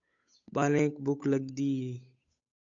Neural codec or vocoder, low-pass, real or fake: codec, 16 kHz, 8 kbps, FunCodec, trained on Chinese and English, 25 frames a second; 7.2 kHz; fake